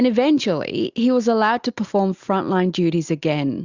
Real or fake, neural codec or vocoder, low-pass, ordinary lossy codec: real; none; 7.2 kHz; Opus, 64 kbps